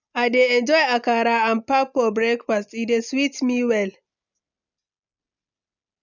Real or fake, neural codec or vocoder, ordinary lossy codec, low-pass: real; none; none; 7.2 kHz